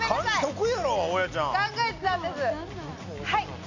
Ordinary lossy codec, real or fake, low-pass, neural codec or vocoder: none; real; 7.2 kHz; none